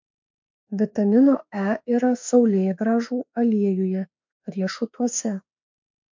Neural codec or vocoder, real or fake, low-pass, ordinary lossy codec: autoencoder, 48 kHz, 32 numbers a frame, DAC-VAE, trained on Japanese speech; fake; 7.2 kHz; MP3, 48 kbps